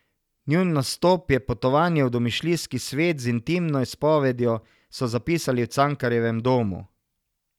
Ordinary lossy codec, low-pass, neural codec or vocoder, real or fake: none; 19.8 kHz; none; real